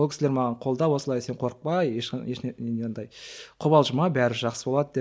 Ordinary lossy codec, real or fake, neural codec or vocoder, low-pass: none; real; none; none